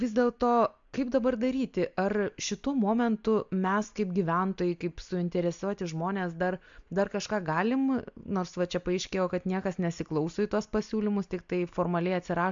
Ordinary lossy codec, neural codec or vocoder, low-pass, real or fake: MP3, 64 kbps; none; 7.2 kHz; real